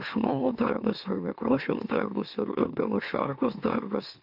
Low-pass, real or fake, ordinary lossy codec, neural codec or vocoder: 5.4 kHz; fake; AAC, 48 kbps; autoencoder, 44.1 kHz, a latent of 192 numbers a frame, MeloTTS